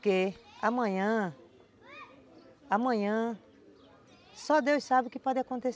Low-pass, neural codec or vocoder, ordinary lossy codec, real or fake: none; none; none; real